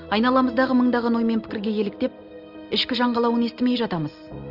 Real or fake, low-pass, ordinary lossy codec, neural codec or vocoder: real; 5.4 kHz; Opus, 24 kbps; none